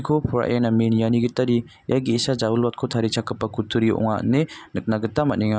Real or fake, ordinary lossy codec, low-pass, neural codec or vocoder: real; none; none; none